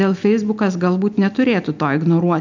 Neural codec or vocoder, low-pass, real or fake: none; 7.2 kHz; real